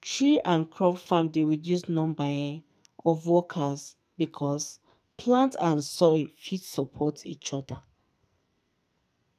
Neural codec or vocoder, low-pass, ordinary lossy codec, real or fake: codec, 44.1 kHz, 2.6 kbps, SNAC; 14.4 kHz; none; fake